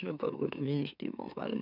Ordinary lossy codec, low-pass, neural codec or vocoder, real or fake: none; 5.4 kHz; autoencoder, 44.1 kHz, a latent of 192 numbers a frame, MeloTTS; fake